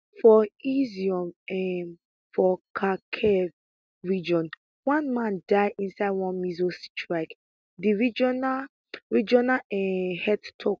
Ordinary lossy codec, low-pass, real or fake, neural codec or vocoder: none; none; real; none